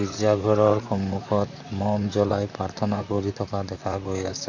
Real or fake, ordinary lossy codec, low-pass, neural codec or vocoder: fake; none; 7.2 kHz; vocoder, 44.1 kHz, 128 mel bands, Pupu-Vocoder